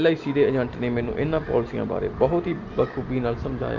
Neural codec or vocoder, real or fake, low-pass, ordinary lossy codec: none; real; 7.2 kHz; Opus, 24 kbps